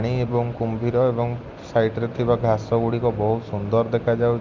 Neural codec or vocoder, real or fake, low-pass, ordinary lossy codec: none; real; 7.2 kHz; Opus, 24 kbps